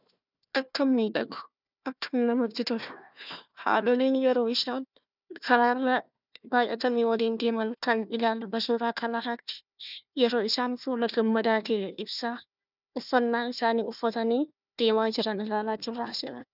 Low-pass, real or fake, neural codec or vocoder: 5.4 kHz; fake; codec, 16 kHz, 1 kbps, FunCodec, trained on Chinese and English, 50 frames a second